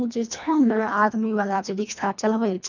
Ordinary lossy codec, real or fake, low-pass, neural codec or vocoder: none; fake; 7.2 kHz; codec, 24 kHz, 1.5 kbps, HILCodec